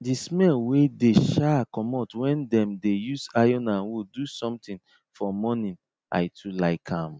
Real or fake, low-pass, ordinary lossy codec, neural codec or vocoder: real; none; none; none